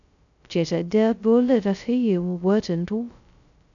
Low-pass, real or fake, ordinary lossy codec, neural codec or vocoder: 7.2 kHz; fake; none; codec, 16 kHz, 0.2 kbps, FocalCodec